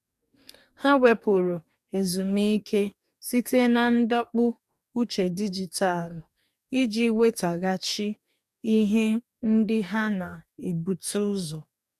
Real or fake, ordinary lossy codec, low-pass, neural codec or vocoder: fake; none; 14.4 kHz; codec, 44.1 kHz, 2.6 kbps, DAC